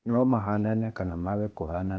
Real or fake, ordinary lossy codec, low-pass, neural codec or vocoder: fake; none; none; codec, 16 kHz, 0.8 kbps, ZipCodec